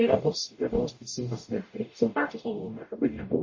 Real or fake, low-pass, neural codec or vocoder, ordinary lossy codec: fake; 7.2 kHz; codec, 44.1 kHz, 0.9 kbps, DAC; MP3, 32 kbps